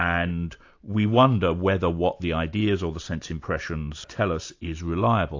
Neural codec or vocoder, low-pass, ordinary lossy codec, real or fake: none; 7.2 kHz; AAC, 48 kbps; real